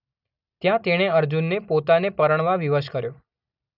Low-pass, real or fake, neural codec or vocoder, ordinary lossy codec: 5.4 kHz; real; none; none